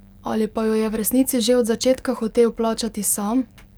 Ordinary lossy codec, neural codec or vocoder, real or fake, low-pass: none; codec, 44.1 kHz, 7.8 kbps, DAC; fake; none